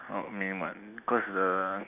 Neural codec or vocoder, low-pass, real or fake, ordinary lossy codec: none; 3.6 kHz; real; none